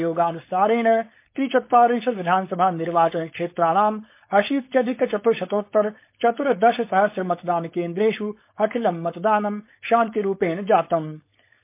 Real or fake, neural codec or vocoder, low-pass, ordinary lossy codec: fake; codec, 16 kHz, 4.8 kbps, FACodec; 3.6 kHz; MP3, 24 kbps